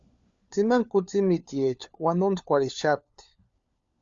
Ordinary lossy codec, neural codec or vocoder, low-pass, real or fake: Opus, 64 kbps; codec, 16 kHz, 4 kbps, FunCodec, trained on LibriTTS, 50 frames a second; 7.2 kHz; fake